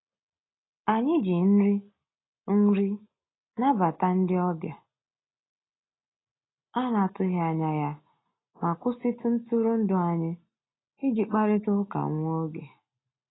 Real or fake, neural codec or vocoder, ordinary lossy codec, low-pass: real; none; AAC, 16 kbps; 7.2 kHz